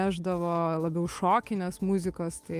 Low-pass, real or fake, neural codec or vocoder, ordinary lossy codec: 14.4 kHz; fake; autoencoder, 48 kHz, 128 numbers a frame, DAC-VAE, trained on Japanese speech; Opus, 32 kbps